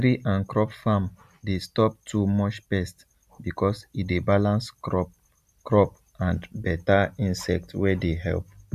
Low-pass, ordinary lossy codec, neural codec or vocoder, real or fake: 14.4 kHz; none; none; real